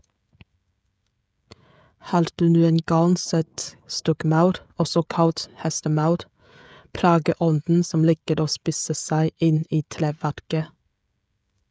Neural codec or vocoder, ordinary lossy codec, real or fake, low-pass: codec, 16 kHz, 16 kbps, FreqCodec, smaller model; none; fake; none